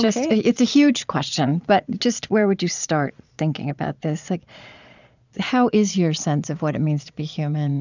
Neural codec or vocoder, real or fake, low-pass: vocoder, 22.05 kHz, 80 mel bands, Vocos; fake; 7.2 kHz